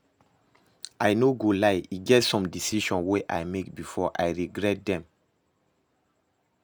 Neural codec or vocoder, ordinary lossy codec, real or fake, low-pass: none; none; real; none